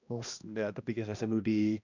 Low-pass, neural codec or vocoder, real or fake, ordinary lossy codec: 7.2 kHz; codec, 16 kHz, 1 kbps, X-Codec, HuBERT features, trained on balanced general audio; fake; none